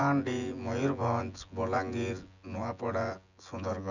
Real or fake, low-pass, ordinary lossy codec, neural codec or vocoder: fake; 7.2 kHz; none; vocoder, 24 kHz, 100 mel bands, Vocos